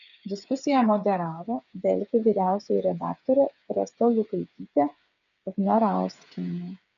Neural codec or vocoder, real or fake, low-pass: codec, 16 kHz, 8 kbps, FreqCodec, smaller model; fake; 7.2 kHz